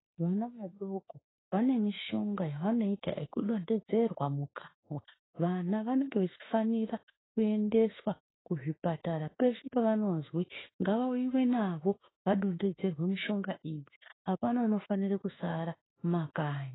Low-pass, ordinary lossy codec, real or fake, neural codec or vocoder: 7.2 kHz; AAC, 16 kbps; fake; autoencoder, 48 kHz, 32 numbers a frame, DAC-VAE, trained on Japanese speech